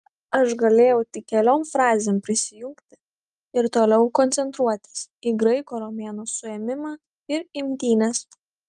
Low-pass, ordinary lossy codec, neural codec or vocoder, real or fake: 10.8 kHz; Opus, 32 kbps; none; real